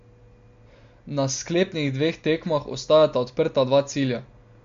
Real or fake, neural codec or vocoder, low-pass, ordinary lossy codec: real; none; 7.2 kHz; MP3, 48 kbps